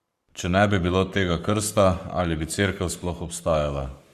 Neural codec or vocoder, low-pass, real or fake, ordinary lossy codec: codec, 44.1 kHz, 7.8 kbps, Pupu-Codec; 14.4 kHz; fake; Opus, 64 kbps